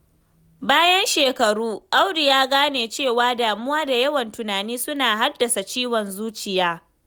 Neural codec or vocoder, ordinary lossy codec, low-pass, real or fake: none; none; none; real